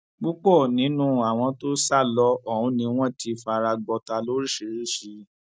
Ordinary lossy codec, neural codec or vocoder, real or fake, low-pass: none; none; real; none